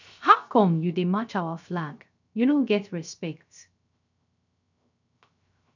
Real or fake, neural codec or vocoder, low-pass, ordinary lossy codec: fake; codec, 16 kHz, 0.3 kbps, FocalCodec; 7.2 kHz; none